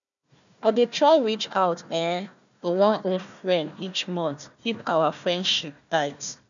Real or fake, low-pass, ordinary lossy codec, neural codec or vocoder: fake; 7.2 kHz; none; codec, 16 kHz, 1 kbps, FunCodec, trained on Chinese and English, 50 frames a second